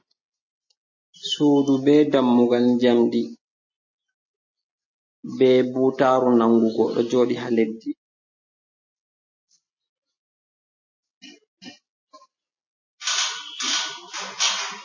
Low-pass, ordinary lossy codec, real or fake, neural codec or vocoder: 7.2 kHz; MP3, 32 kbps; real; none